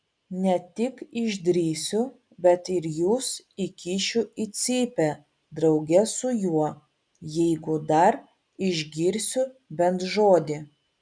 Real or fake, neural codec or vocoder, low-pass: real; none; 9.9 kHz